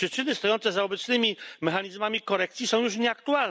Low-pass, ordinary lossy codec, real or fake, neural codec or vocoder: none; none; real; none